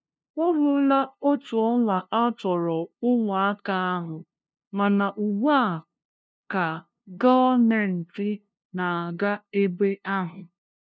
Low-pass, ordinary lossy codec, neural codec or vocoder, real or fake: none; none; codec, 16 kHz, 0.5 kbps, FunCodec, trained on LibriTTS, 25 frames a second; fake